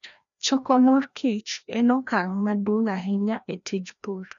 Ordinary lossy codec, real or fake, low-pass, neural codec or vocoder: none; fake; 7.2 kHz; codec, 16 kHz, 1 kbps, FreqCodec, larger model